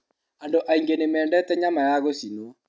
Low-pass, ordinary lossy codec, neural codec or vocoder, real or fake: none; none; none; real